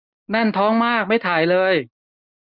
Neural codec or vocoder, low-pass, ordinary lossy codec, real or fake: none; 5.4 kHz; none; real